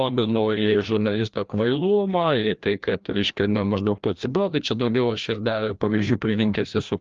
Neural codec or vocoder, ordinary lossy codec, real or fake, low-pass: codec, 16 kHz, 1 kbps, FreqCodec, larger model; Opus, 32 kbps; fake; 7.2 kHz